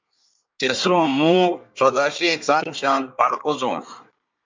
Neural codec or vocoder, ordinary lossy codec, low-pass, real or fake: codec, 24 kHz, 1 kbps, SNAC; MP3, 64 kbps; 7.2 kHz; fake